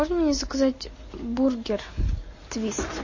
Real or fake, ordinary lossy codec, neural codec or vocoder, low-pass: real; MP3, 32 kbps; none; 7.2 kHz